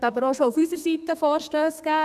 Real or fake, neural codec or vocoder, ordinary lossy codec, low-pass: fake; codec, 32 kHz, 1.9 kbps, SNAC; none; 14.4 kHz